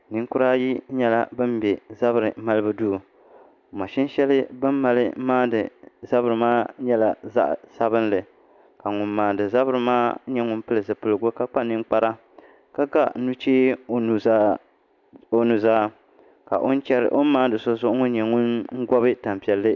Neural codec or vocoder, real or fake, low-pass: none; real; 7.2 kHz